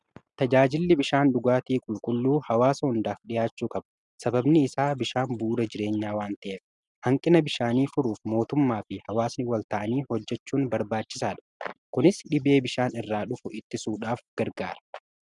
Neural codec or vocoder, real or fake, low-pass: none; real; 10.8 kHz